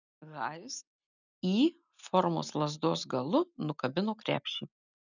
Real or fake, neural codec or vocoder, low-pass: real; none; 7.2 kHz